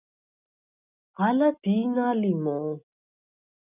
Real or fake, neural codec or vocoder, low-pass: real; none; 3.6 kHz